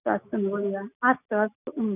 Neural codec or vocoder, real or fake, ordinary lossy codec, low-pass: none; real; none; 3.6 kHz